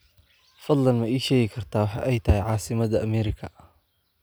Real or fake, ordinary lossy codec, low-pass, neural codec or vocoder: real; none; none; none